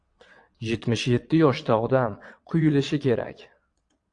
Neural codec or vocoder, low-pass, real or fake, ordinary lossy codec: vocoder, 22.05 kHz, 80 mel bands, WaveNeXt; 9.9 kHz; fake; AAC, 64 kbps